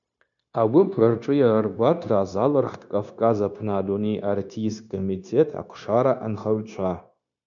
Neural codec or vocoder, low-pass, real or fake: codec, 16 kHz, 0.9 kbps, LongCat-Audio-Codec; 7.2 kHz; fake